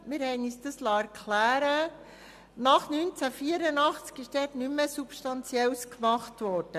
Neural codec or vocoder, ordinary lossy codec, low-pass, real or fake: none; MP3, 96 kbps; 14.4 kHz; real